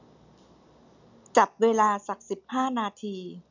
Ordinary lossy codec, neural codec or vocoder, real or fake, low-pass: none; none; real; 7.2 kHz